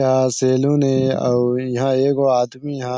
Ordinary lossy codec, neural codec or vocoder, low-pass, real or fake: none; none; none; real